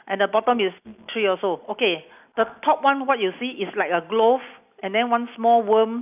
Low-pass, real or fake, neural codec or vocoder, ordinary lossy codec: 3.6 kHz; real; none; none